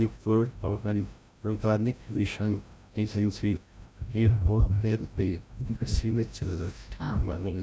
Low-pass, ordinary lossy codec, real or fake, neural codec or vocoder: none; none; fake; codec, 16 kHz, 0.5 kbps, FreqCodec, larger model